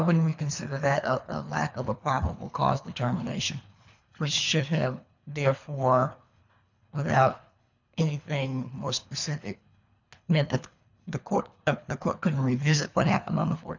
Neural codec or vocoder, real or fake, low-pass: codec, 24 kHz, 3 kbps, HILCodec; fake; 7.2 kHz